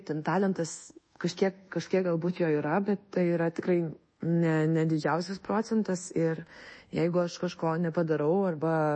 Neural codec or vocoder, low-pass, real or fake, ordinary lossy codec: codec, 24 kHz, 1.2 kbps, DualCodec; 9.9 kHz; fake; MP3, 32 kbps